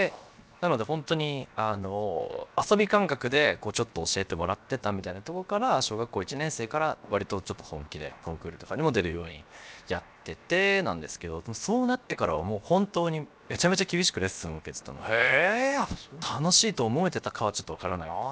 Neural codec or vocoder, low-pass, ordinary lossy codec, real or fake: codec, 16 kHz, 0.7 kbps, FocalCodec; none; none; fake